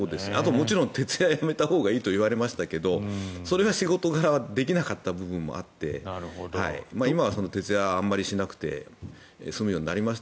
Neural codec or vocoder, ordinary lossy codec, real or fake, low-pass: none; none; real; none